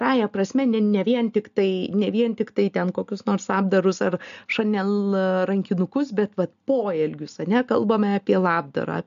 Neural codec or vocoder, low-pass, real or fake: none; 7.2 kHz; real